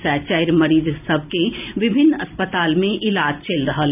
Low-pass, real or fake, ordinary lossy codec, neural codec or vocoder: 3.6 kHz; real; none; none